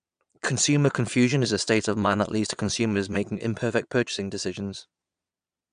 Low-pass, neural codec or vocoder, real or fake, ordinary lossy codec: 9.9 kHz; vocoder, 22.05 kHz, 80 mel bands, Vocos; fake; none